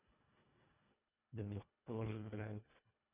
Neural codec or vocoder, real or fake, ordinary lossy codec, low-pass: codec, 24 kHz, 1.5 kbps, HILCodec; fake; none; 3.6 kHz